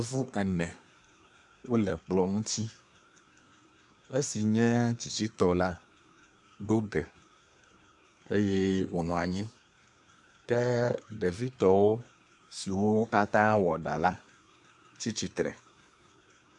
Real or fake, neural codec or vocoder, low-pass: fake; codec, 24 kHz, 1 kbps, SNAC; 10.8 kHz